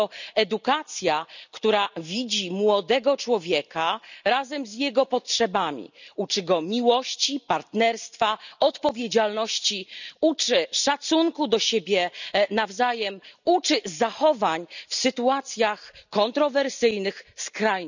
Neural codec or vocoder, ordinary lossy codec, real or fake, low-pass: none; none; real; 7.2 kHz